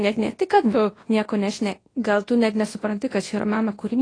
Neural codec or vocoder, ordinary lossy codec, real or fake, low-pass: codec, 24 kHz, 0.9 kbps, WavTokenizer, large speech release; AAC, 32 kbps; fake; 9.9 kHz